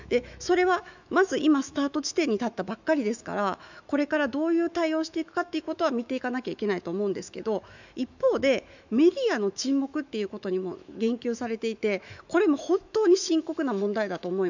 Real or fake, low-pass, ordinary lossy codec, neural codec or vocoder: fake; 7.2 kHz; none; autoencoder, 48 kHz, 128 numbers a frame, DAC-VAE, trained on Japanese speech